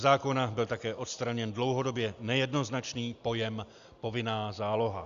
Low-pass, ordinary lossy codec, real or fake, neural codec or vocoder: 7.2 kHz; Opus, 64 kbps; real; none